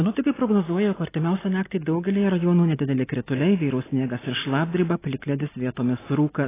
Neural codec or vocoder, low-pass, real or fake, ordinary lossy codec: none; 3.6 kHz; real; AAC, 16 kbps